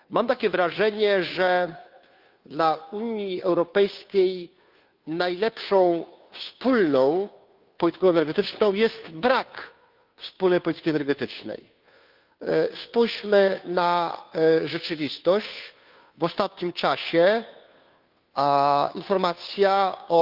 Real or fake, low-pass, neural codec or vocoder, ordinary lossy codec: fake; 5.4 kHz; codec, 16 kHz, 2 kbps, FunCodec, trained on Chinese and English, 25 frames a second; Opus, 24 kbps